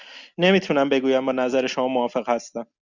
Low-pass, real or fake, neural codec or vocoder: 7.2 kHz; real; none